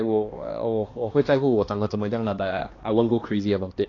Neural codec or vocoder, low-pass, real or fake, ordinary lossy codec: codec, 16 kHz, 2 kbps, X-Codec, HuBERT features, trained on balanced general audio; 7.2 kHz; fake; AAC, 32 kbps